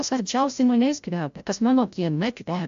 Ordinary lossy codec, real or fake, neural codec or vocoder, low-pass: AAC, 64 kbps; fake; codec, 16 kHz, 0.5 kbps, FreqCodec, larger model; 7.2 kHz